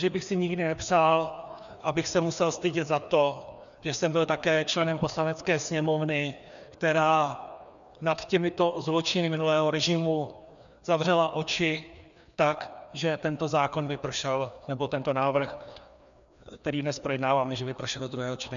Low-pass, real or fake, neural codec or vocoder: 7.2 kHz; fake; codec, 16 kHz, 2 kbps, FreqCodec, larger model